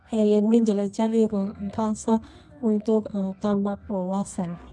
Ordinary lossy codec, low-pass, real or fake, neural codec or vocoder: none; none; fake; codec, 24 kHz, 0.9 kbps, WavTokenizer, medium music audio release